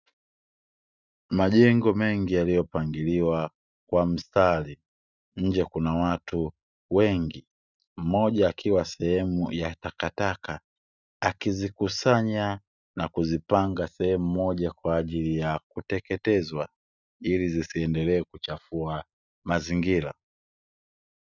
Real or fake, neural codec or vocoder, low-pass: real; none; 7.2 kHz